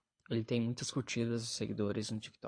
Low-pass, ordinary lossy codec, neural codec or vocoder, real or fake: 9.9 kHz; MP3, 48 kbps; codec, 24 kHz, 6 kbps, HILCodec; fake